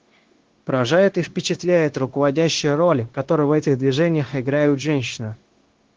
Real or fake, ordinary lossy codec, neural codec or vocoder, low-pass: fake; Opus, 16 kbps; codec, 16 kHz, 0.9 kbps, LongCat-Audio-Codec; 7.2 kHz